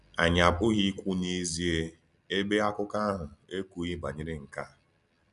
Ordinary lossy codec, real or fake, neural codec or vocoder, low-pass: MP3, 96 kbps; real; none; 10.8 kHz